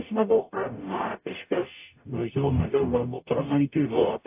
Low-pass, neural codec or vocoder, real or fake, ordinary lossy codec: 3.6 kHz; codec, 44.1 kHz, 0.9 kbps, DAC; fake; AAC, 32 kbps